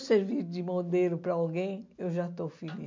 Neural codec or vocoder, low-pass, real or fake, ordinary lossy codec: none; 7.2 kHz; real; MP3, 48 kbps